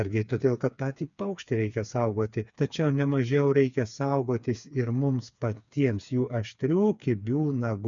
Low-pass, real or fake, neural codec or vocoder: 7.2 kHz; fake; codec, 16 kHz, 4 kbps, FreqCodec, smaller model